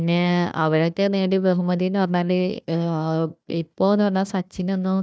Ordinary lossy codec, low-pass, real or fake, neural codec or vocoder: none; none; fake; codec, 16 kHz, 1 kbps, FunCodec, trained on Chinese and English, 50 frames a second